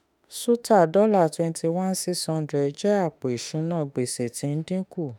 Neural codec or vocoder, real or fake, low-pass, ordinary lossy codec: autoencoder, 48 kHz, 32 numbers a frame, DAC-VAE, trained on Japanese speech; fake; none; none